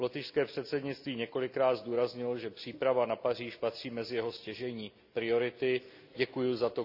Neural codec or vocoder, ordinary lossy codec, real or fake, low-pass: none; none; real; 5.4 kHz